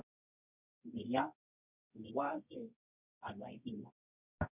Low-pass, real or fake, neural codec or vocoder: 3.6 kHz; fake; codec, 24 kHz, 0.9 kbps, WavTokenizer, medium music audio release